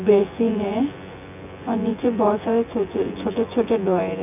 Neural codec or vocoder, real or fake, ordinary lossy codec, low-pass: vocoder, 24 kHz, 100 mel bands, Vocos; fake; none; 3.6 kHz